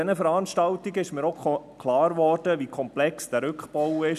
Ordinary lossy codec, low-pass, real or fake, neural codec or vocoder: none; 14.4 kHz; real; none